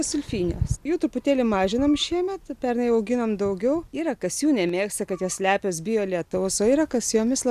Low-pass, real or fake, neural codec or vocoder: 14.4 kHz; real; none